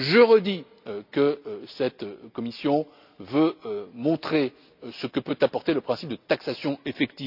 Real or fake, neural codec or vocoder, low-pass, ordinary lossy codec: real; none; 5.4 kHz; none